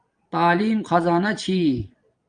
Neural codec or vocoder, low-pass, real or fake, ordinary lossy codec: vocoder, 22.05 kHz, 80 mel bands, Vocos; 9.9 kHz; fake; Opus, 32 kbps